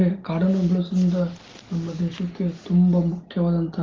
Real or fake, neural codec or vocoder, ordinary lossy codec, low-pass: real; none; Opus, 16 kbps; 7.2 kHz